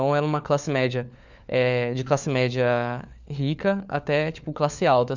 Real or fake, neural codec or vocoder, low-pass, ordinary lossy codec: fake; codec, 16 kHz, 4 kbps, FunCodec, trained on LibriTTS, 50 frames a second; 7.2 kHz; none